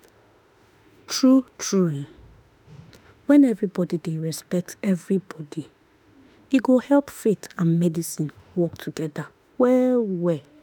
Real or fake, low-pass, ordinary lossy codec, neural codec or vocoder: fake; none; none; autoencoder, 48 kHz, 32 numbers a frame, DAC-VAE, trained on Japanese speech